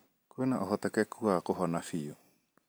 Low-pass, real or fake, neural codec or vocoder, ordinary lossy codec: none; real; none; none